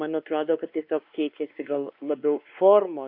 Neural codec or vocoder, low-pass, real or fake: codec, 16 kHz, 2 kbps, X-Codec, WavLM features, trained on Multilingual LibriSpeech; 5.4 kHz; fake